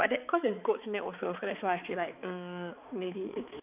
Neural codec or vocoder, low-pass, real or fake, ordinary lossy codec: codec, 16 kHz, 4 kbps, X-Codec, HuBERT features, trained on balanced general audio; 3.6 kHz; fake; none